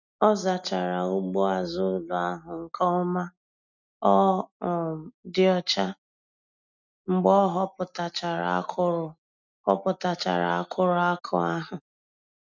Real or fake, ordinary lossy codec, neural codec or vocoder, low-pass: real; none; none; 7.2 kHz